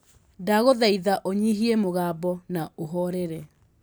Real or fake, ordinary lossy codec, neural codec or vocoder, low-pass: real; none; none; none